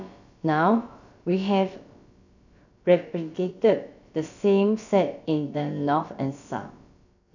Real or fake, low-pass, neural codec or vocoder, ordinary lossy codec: fake; 7.2 kHz; codec, 16 kHz, about 1 kbps, DyCAST, with the encoder's durations; none